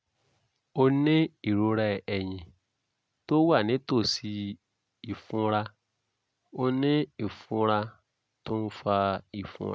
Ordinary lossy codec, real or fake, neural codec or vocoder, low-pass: none; real; none; none